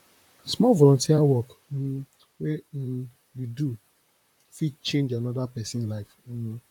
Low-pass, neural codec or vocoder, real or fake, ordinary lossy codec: 19.8 kHz; vocoder, 44.1 kHz, 128 mel bands, Pupu-Vocoder; fake; none